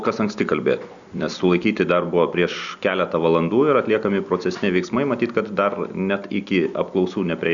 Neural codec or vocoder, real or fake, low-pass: none; real; 7.2 kHz